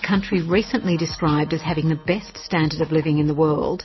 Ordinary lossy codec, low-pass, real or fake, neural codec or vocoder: MP3, 24 kbps; 7.2 kHz; real; none